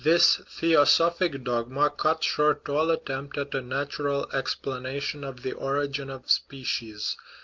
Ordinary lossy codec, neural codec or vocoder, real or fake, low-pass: Opus, 32 kbps; none; real; 7.2 kHz